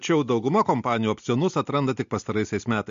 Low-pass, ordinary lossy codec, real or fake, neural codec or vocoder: 7.2 kHz; MP3, 48 kbps; real; none